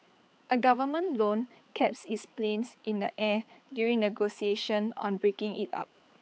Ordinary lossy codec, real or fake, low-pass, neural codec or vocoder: none; fake; none; codec, 16 kHz, 4 kbps, X-Codec, HuBERT features, trained on balanced general audio